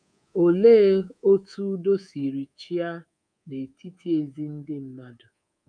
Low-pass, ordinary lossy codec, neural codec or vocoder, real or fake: 9.9 kHz; none; codec, 24 kHz, 3.1 kbps, DualCodec; fake